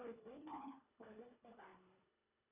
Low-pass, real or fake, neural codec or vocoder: 3.6 kHz; fake; codec, 24 kHz, 1.5 kbps, HILCodec